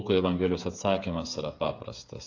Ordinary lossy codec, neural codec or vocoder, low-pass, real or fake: AAC, 48 kbps; codec, 16 kHz, 8 kbps, FreqCodec, smaller model; 7.2 kHz; fake